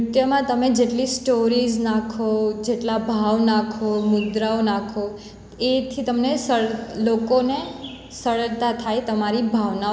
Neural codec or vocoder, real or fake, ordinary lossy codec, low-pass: none; real; none; none